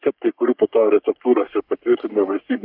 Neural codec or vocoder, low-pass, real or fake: codec, 44.1 kHz, 3.4 kbps, Pupu-Codec; 5.4 kHz; fake